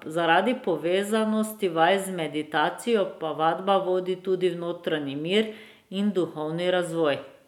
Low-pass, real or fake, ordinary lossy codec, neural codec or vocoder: 19.8 kHz; real; none; none